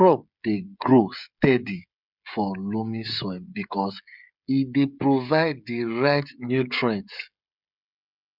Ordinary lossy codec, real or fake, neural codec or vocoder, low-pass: none; fake; codec, 16 kHz, 16 kbps, FreqCodec, smaller model; 5.4 kHz